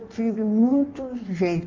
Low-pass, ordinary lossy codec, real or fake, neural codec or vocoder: 7.2 kHz; Opus, 32 kbps; fake; codec, 16 kHz in and 24 kHz out, 1.1 kbps, FireRedTTS-2 codec